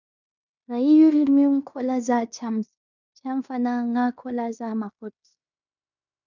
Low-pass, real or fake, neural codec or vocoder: 7.2 kHz; fake; codec, 16 kHz in and 24 kHz out, 0.9 kbps, LongCat-Audio-Codec, fine tuned four codebook decoder